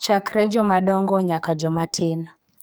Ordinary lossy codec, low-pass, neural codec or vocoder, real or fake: none; none; codec, 44.1 kHz, 2.6 kbps, SNAC; fake